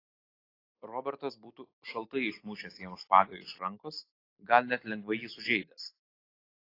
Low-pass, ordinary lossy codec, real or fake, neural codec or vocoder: 5.4 kHz; AAC, 24 kbps; real; none